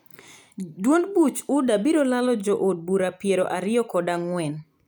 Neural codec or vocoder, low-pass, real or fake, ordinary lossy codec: none; none; real; none